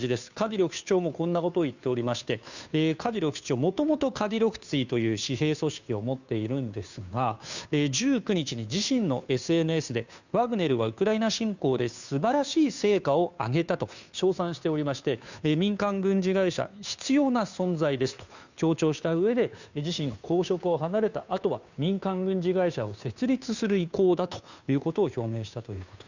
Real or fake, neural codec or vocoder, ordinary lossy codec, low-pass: fake; codec, 16 kHz, 2 kbps, FunCodec, trained on Chinese and English, 25 frames a second; none; 7.2 kHz